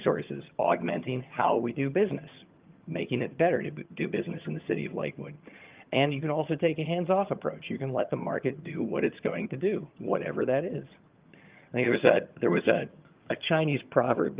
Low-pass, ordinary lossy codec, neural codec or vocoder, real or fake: 3.6 kHz; Opus, 32 kbps; vocoder, 22.05 kHz, 80 mel bands, HiFi-GAN; fake